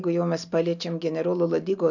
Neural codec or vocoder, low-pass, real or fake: none; 7.2 kHz; real